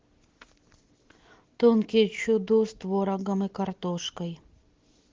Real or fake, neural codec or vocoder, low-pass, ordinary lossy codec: real; none; 7.2 kHz; Opus, 16 kbps